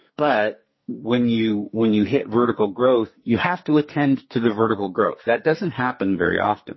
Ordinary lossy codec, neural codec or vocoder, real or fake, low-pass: MP3, 24 kbps; codec, 44.1 kHz, 2.6 kbps, SNAC; fake; 7.2 kHz